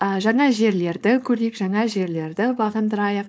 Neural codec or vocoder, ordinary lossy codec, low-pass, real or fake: codec, 16 kHz, 4.8 kbps, FACodec; none; none; fake